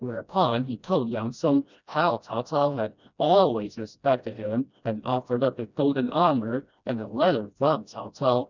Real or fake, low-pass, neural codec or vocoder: fake; 7.2 kHz; codec, 16 kHz, 1 kbps, FreqCodec, smaller model